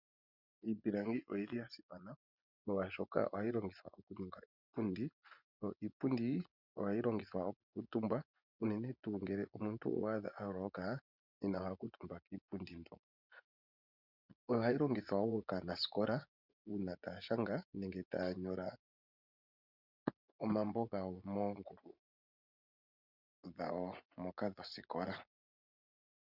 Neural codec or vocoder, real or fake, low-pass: vocoder, 24 kHz, 100 mel bands, Vocos; fake; 5.4 kHz